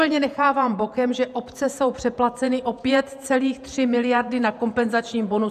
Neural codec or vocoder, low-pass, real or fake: vocoder, 48 kHz, 128 mel bands, Vocos; 14.4 kHz; fake